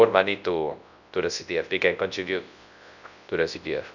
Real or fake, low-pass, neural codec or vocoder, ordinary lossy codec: fake; 7.2 kHz; codec, 24 kHz, 0.9 kbps, WavTokenizer, large speech release; none